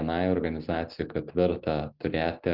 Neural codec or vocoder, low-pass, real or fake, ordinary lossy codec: none; 5.4 kHz; real; Opus, 24 kbps